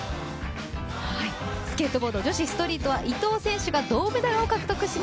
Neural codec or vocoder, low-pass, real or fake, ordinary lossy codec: none; none; real; none